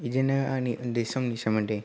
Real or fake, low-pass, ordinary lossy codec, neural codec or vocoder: real; none; none; none